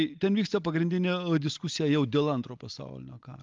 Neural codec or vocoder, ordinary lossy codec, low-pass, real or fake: none; Opus, 32 kbps; 7.2 kHz; real